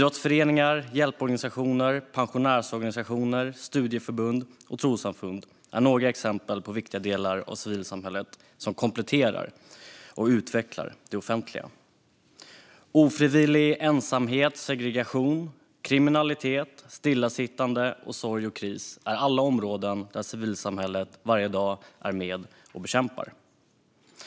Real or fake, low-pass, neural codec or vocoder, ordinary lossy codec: real; none; none; none